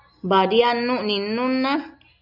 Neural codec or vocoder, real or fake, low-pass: none; real; 5.4 kHz